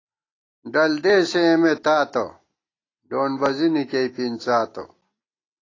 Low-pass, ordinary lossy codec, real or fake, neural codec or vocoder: 7.2 kHz; AAC, 32 kbps; real; none